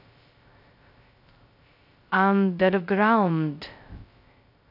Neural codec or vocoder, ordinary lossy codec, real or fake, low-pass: codec, 16 kHz, 0.2 kbps, FocalCodec; none; fake; 5.4 kHz